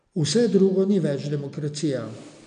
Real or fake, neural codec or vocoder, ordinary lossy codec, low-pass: real; none; none; 9.9 kHz